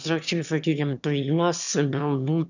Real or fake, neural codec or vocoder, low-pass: fake; autoencoder, 22.05 kHz, a latent of 192 numbers a frame, VITS, trained on one speaker; 7.2 kHz